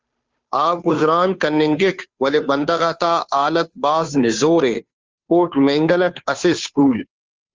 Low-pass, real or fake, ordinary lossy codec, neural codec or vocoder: 7.2 kHz; fake; Opus, 32 kbps; codec, 16 kHz, 2 kbps, FunCodec, trained on Chinese and English, 25 frames a second